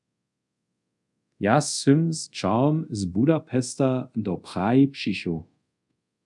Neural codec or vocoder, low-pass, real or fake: codec, 24 kHz, 0.5 kbps, DualCodec; 10.8 kHz; fake